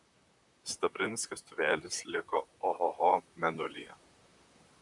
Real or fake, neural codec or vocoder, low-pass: fake; vocoder, 44.1 kHz, 128 mel bands, Pupu-Vocoder; 10.8 kHz